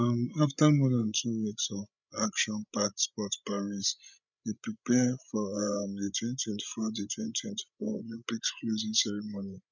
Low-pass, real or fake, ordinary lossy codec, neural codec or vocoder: 7.2 kHz; fake; none; codec, 16 kHz, 16 kbps, FreqCodec, larger model